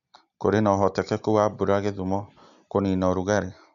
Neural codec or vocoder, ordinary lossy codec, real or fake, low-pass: none; none; real; 7.2 kHz